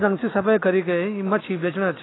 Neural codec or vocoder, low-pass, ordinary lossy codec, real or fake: none; 7.2 kHz; AAC, 16 kbps; real